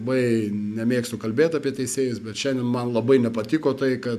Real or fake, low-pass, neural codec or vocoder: real; 14.4 kHz; none